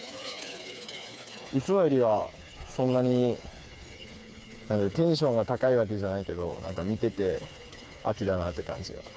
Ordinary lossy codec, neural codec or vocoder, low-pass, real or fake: none; codec, 16 kHz, 4 kbps, FreqCodec, smaller model; none; fake